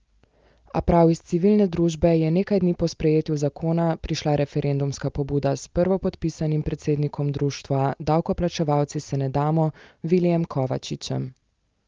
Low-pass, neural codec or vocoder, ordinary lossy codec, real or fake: 7.2 kHz; none; Opus, 32 kbps; real